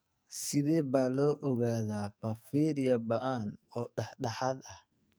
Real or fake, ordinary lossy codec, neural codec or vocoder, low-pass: fake; none; codec, 44.1 kHz, 2.6 kbps, SNAC; none